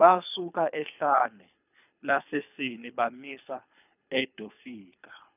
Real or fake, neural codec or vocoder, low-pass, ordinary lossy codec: fake; codec, 24 kHz, 3 kbps, HILCodec; 3.6 kHz; none